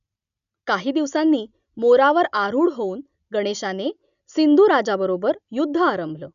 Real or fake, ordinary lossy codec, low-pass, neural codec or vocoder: real; none; 7.2 kHz; none